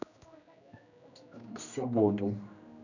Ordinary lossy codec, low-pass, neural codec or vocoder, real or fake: none; 7.2 kHz; codec, 16 kHz, 1 kbps, X-Codec, HuBERT features, trained on general audio; fake